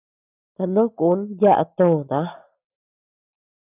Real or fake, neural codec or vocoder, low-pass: real; none; 3.6 kHz